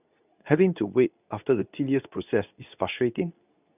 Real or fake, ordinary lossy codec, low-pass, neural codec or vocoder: fake; none; 3.6 kHz; codec, 24 kHz, 0.9 kbps, WavTokenizer, medium speech release version 2